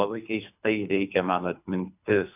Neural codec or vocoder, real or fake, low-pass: codec, 24 kHz, 3 kbps, HILCodec; fake; 3.6 kHz